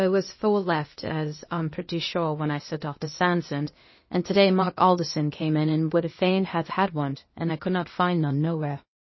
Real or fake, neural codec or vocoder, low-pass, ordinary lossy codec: fake; codec, 16 kHz, 0.8 kbps, ZipCodec; 7.2 kHz; MP3, 24 kbps